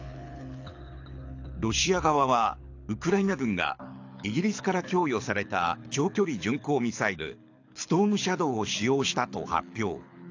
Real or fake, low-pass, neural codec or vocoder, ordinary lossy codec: fake; 7.2 kHz; codec, 24 kHz, 6 kbps, HILCodec; AAC, 48 kbps